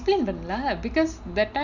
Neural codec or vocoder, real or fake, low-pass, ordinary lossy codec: none; real; 7.2 kHz; none